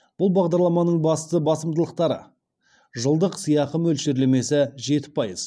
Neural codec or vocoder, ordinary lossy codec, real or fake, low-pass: none; none; real; none